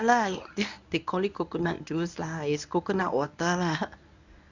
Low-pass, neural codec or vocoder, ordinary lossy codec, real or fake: 7.2 kHz; codec, 24 kHz, 0.9 kbps, WavTokenizer, medium speech release version 1; none; fake